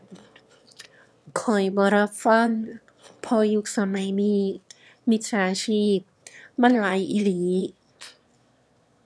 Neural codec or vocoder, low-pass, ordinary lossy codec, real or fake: autoencoder, 22.05 kHz, a latent of 192 numbers a frame, VITS, trained on one speaker; none; none; fake